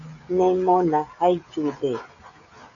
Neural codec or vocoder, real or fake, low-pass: codec, 16 kHz, 16 kbps, FreqCodec, smaller model; fake; 7.2 kHz